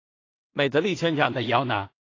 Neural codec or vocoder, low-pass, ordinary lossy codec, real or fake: codec, 16 kHz in and 24 kHz out, 0.4 kbps, LongCat-Audio-Codec, two codebook decoder; 7.2 kHz; AAC, 32 kbps; fake